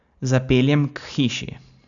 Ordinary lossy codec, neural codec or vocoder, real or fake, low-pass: none; none; real; 7.2 kHz